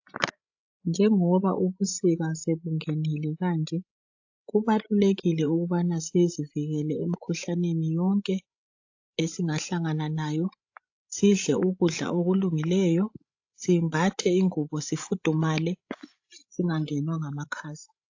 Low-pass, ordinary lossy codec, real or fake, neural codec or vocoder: 7.2 kHz; AAC, 48 kbps; fake; codec, 16 kHz, 16 kbps, FreqCodec, larger model